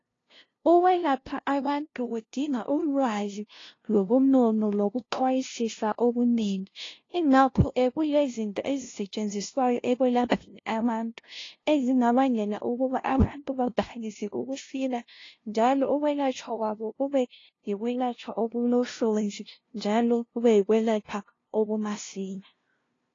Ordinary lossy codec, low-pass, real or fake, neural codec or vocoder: AAC, 32 kbps; 7.2 kHz; fake; codec, 16 kHz, 0.5 kbps, FunCodec, trained on LibriTTS, 25 frames a second